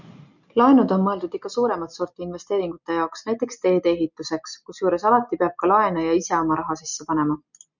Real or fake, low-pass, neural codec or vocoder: real; 7.2 kHz; none